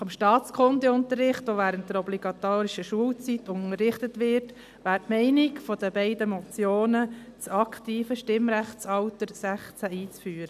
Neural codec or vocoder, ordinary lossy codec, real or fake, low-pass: none; none; real; 14.4 kHz